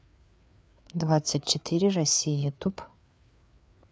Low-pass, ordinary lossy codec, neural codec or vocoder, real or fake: none; none; codec, 16 kHz, 4 kbps, FreqCodec, larger model; fake